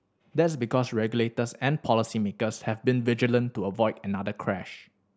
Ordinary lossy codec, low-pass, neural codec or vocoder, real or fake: none; none; none; real